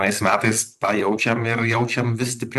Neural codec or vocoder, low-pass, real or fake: vocoder, 44.1 kHz, 128 mel bands, Pupu-Vocoder; 14.4 kHz; fake